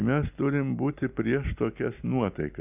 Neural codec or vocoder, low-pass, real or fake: none; 3.6 kHz; real